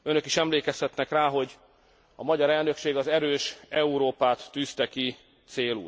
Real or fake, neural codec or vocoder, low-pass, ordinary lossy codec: real; none; none; none